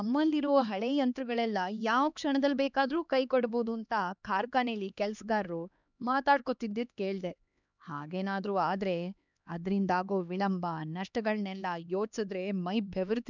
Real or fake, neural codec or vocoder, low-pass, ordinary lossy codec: fake; codec, 16 kHz, 2 kbps, X-Codec, HuBERT features, trained on LibriSpeech; 7.2 kHz; none